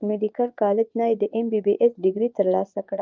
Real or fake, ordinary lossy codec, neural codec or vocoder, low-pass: fake; Opus, 24 kbps; vocoder, 24 kHz, 100 mel bands, Vocos; 7.2 kHz